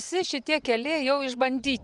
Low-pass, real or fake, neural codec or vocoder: 10.8 kHz; fake; vocoder, 24 kHz, 100 mel bands, Vocos